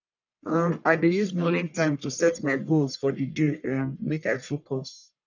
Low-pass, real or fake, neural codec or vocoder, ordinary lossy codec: 7.2 kHz; fake; codec, 44.1 kHz, 1.7 kbps, Pupu-Codec; none